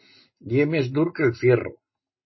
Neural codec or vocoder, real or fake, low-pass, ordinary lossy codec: none; real; 7.2 kHz; MP3, 24 kbps